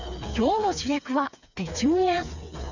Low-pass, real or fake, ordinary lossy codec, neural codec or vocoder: 7.2 kHz; fake; none; codec, 16 kHz, 4 kbps, FreqCodec, smaller model